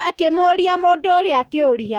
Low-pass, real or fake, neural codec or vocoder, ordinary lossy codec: 19.8 kHz; fake; codec, 44.1 kHz, 2.6 kbps, DAC; none